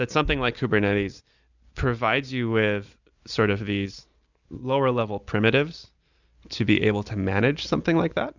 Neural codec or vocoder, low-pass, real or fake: none; 7.2 kHz; real